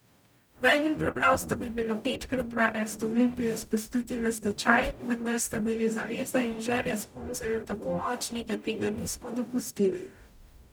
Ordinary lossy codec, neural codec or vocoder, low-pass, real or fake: none; codec, 44.1 kHz, 0.9 kbps, DAC; none; fake